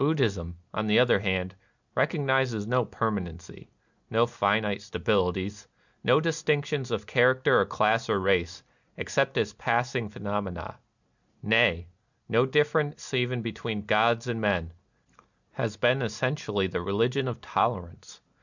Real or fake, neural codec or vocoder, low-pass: real; none; 7.2 kHz